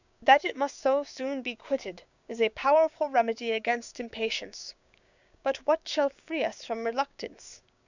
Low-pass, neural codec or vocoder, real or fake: 7.2 kHz; codec, 16 kHz, 6 kbps, DAC; fake